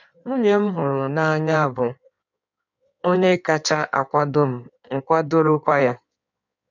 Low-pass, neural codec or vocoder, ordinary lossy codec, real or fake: 7.2 kHz; codec, 16 kHz in and 24 kHz out, 1.1 kbps, FireRedTTS-2 codec; none; fake